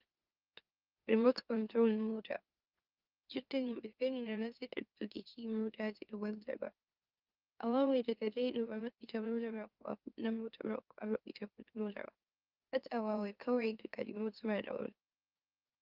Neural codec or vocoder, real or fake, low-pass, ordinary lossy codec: autoencoder, 44.1 kHz, a latent of 192 numbers a frame, MeloTTS; fake; 5.4 kHz; Opus, 24 kbps